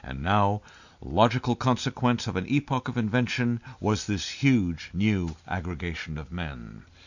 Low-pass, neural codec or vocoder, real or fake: 7.2 kHz; none; real